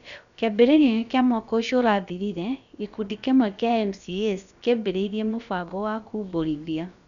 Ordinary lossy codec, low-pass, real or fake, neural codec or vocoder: none; 7.2 kHz; fake; codec, 16 kHz, about 1 kbps, DyCAST, with the encoder's durations